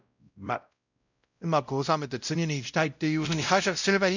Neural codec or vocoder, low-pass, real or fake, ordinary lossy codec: codec, 16 kHz, 0.5 kbps, X-Codec, WavLM features, trained on Multilingual LibriSpeech; 7.2 kHz; fake; none